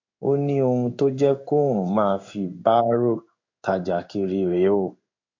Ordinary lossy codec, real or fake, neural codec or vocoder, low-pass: MP3, 48 kbps; fake; codec, 16 kHz in and 24 kHz out, 1 kbps, XY-Tokenizer; 7.2 kHz